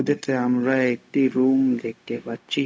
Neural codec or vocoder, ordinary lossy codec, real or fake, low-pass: codec, 16 kHz, 0.4 kbps, LongCat-Audio-Codec; none; fake; none